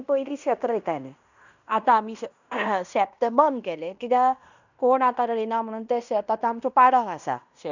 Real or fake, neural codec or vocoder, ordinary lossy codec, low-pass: fake; codec, 16 kHz in and 24 kHz out, 0.9 kbps, LongCat-Audio-Codec, fine tuned four codebook decoder; none; 7.2 kHz